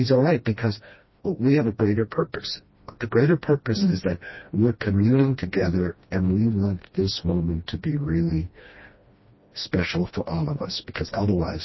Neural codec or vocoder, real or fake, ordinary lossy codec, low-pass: codec, 16 kHz, 1 kbps, FreqCodec, smaller model; fake; MP3, 24 kbps; 7.2 kHz